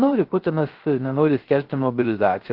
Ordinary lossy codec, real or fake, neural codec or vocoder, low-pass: Opus, 16 kbps; fake; codec, 16 kHz, 0.3 kbps, FocalCodec; 5.4 kHz